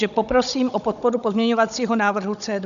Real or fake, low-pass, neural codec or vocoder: fake; 7.2 kHz; codec, 16 kHz, 16 kbps, FunCodec, trained on Chinese and English, 50 frames a second